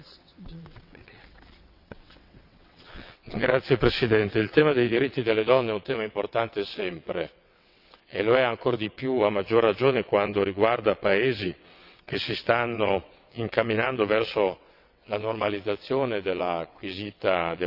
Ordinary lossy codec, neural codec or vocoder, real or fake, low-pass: none; vocoder, 22.05 kHz, 80 mel bands, WaveNeXt; fake; 5.4 kHz